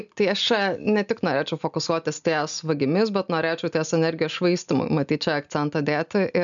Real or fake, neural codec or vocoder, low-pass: real; none; 7.2 kHz